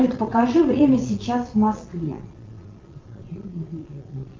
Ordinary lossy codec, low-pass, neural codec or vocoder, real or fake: Opus, 32 kbps; 7.2 kHz; vocoder, 22.05 kHz, 80 mel bands, Vocos; fake